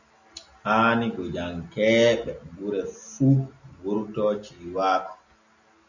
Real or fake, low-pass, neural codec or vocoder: real; 7.2 kHz; none